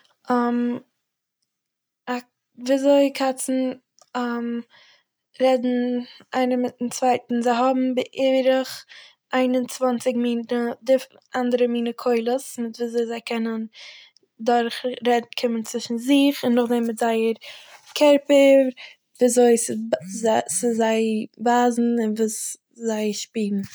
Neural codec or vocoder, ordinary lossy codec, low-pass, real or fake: none; none; none; real